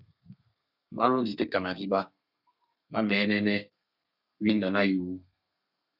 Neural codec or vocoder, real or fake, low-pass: codec, 32 kHz, 1.9 kbps, SNAC; fake; 5.4 kHz